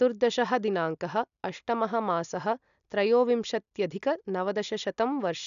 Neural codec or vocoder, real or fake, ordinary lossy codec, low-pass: none; real; none; 7.2 kHz